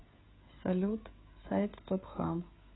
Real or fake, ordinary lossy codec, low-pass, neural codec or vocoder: fake; AAC, 16 kbps; 7.2 kHz; codec, 16 kHz, 16 kbps, FreqCodec, smaller model